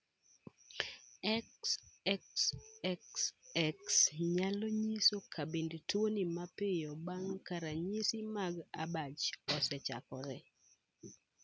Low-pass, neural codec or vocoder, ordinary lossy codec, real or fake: none; none; none; real